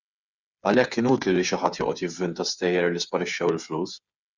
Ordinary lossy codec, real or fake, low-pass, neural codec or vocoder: Opus, 64 kbps; fake; 7.2 kHz; codec, 16 kHz, 8 kbps, FreqCodec, smaller model